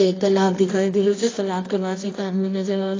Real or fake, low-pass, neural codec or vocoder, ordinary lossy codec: fake; 7.2 kHz; codec, 24 kHz, 0.9 kbps, WavTokenizer, medium music audio release; AAC, 32 kbps